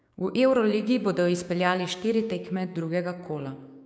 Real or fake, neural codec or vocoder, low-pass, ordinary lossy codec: fake; codec, 16 kHz, 6 kbps, DAC; none; none